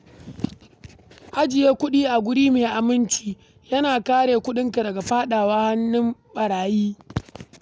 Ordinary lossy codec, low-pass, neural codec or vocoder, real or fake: none; none; none; real